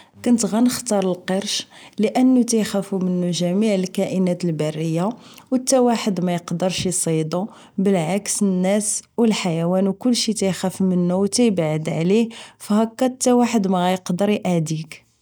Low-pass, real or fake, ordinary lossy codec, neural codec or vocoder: none; real; none; none